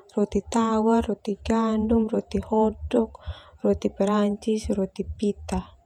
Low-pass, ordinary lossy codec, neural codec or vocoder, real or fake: 19.8 kHz; none; vocoder, 48 kHz, 128 mel bands, Vocos; fake